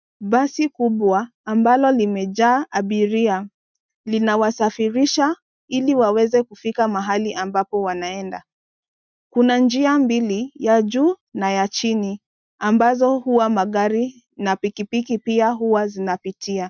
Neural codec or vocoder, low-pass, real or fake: none; 7.2 kHz; real